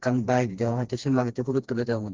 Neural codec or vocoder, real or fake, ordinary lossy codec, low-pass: codec, 16 kHz, 2 kbps, FreqCodec, smaller model; fake; Opus, 16 kbps; 7.2 kHz